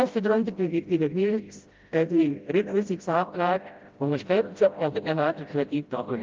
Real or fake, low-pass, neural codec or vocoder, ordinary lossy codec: fake; 7.2 kHz; codec, 16 kHz, 0.5 kbps, FreqCodec, smaller model; Opus, 32 kbps